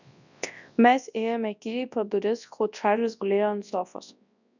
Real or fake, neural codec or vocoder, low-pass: fake; codec, 24 kHz, 0.9 kbps, WavTokenizer, large speech release; 7.2 kHz